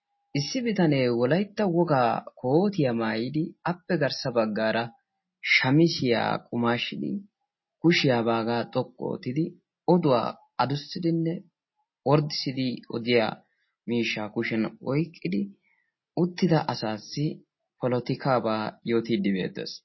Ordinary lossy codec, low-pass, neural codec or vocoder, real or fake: MP3, 24 kbps; 7.2 kHz; none; real